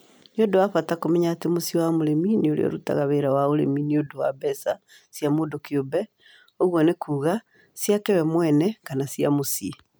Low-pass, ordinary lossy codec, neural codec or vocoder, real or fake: none; none; none; real